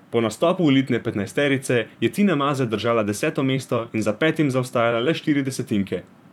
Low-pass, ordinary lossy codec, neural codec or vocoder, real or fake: 19.8 kHz; none; vocoder, 44.1 kHz, 128 mel bands, Pupu-Vocoder; fake